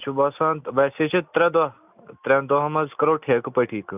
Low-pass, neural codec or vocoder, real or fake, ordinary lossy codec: 3.6 kHz; none; real; none